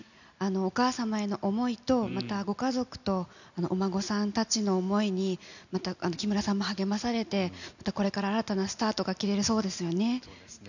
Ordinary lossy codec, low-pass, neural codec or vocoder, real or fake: none; 7.2 kHz; none; real